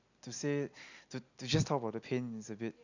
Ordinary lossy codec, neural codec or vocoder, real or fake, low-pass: none; none; real; 7.2 kHz